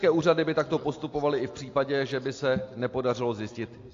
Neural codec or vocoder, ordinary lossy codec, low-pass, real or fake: none; AAC, 48 kbps; 7.2 kHz; real